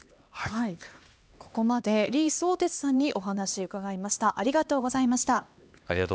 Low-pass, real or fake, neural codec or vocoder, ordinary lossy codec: none; fake; codec, 16 kHz, 2 kbps, X-Codec, HuBERT features, trained on LibriSpeech; none